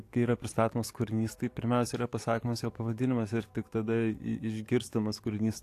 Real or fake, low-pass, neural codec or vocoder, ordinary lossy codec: fake; 14.4 kHz; codec, 44.1 kHz, 7.8 kbps, DAC; AAC, 64 kbps